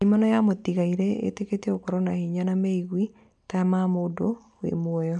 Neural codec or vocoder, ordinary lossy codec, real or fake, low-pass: none; none; real; 10.8 kHz